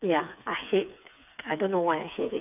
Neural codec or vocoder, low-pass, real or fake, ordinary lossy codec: codec, 16 kHz, 4 kbps, FreqCodec, smaller model; 3.6 kHz; fake; none